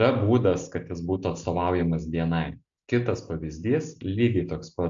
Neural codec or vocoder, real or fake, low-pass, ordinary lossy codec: none; real; 7.2 kHz; AAC, 64 kbps